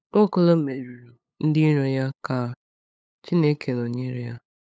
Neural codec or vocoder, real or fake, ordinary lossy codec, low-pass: codec, 16 kHz, 8 kbps, FunCodec, trained on LibriTTS, 25 frames a second; fake; none; none